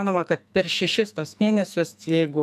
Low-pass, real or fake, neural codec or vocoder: 14.4 kHz; fake; codec, 44.1 kHz, 2.6 kbps, SNAC